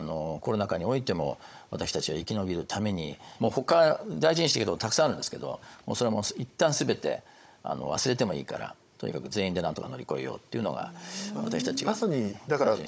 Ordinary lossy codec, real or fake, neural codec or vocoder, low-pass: none; fake; codec, 16 kHz, 16 kbps, FunCodec, trained on Chinese and English, 50 frames a second; none